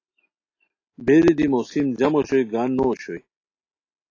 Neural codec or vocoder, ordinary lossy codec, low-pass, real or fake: none; AAC, 32 kbps; 7.2 kHz; real